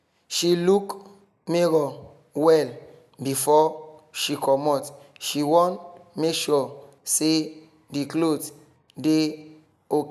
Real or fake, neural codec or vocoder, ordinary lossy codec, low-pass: real; none; none; 14.4 kHz